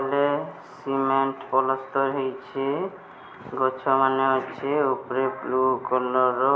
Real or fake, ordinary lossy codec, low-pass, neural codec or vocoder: real; none; none; none